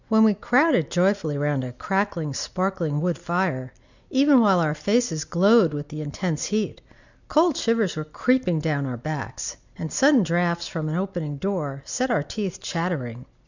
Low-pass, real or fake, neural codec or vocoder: 7.2 kHz; real; none